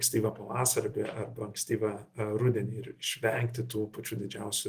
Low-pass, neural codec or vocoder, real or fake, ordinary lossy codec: 14.4 kHz; none; real; Opus, 24 kbps